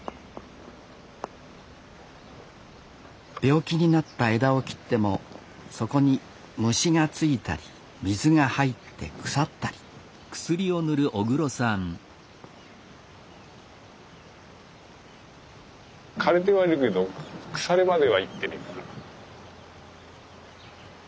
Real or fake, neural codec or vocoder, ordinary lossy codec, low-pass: real; none; none; none